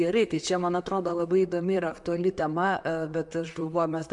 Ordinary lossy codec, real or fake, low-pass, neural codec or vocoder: MP3, 64 kbps; fake; 10.8 kHz; vocoder, 44.1 kHz, 128 mel bands, Pupu-Vocoder